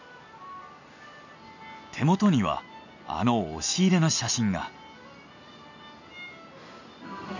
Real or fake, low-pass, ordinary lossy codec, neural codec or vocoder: real; 7.2 kHz; none; none